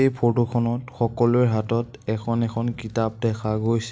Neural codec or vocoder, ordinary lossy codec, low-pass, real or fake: none; none; none; real